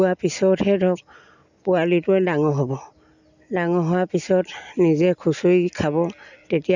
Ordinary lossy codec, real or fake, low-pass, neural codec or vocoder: none; real; 7.2 kHz; none